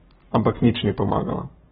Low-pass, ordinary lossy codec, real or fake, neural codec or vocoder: 19.8 kHz; AAC, 16 kbps; fake; codec, 44.1 kHz, 7.8 kbps, Pupu-Codec